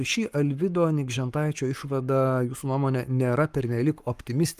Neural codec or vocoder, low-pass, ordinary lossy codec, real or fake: codec, 44.1 kHz, 7.8 kbps, Pupu-Codec; 14.4 kHz; Opus, 32 kbps; fake